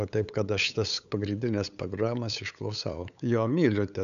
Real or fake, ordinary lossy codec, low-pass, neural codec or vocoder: fake; MP3, 96 kbps; 7.2 kHz; codec, 16 kHz, 8 kbps, FunCodec, trained on LibriTTS, 25 frames a second